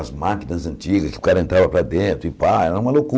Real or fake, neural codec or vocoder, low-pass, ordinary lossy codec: real; none; none; none